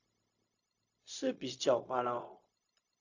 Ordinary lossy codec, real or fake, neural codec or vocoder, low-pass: AAC, 48 kbps; fake; codec, 16 kHz, 0.4 kbps, LongCat-Audio-Codec; 7.2 kHz